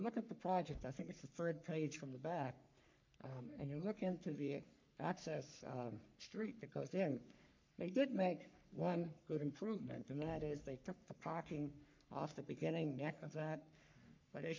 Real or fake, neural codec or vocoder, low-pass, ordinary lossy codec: fake; codec, 44.1 kHz, 3.4 kbps, Pupu-Codec; 7.2 kHz; MP3, 48 kbps